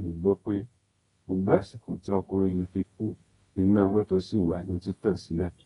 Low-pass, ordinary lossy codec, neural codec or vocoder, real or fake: 10.8 kHz; AAC, 32 kbps; codec, 24 kHz, 0.9 kbps, WavTokenizer, medium music audio release; fake